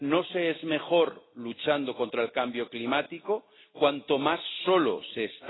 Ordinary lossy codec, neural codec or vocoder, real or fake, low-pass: AAC, 16 kbps; none; real; 7.2 kHz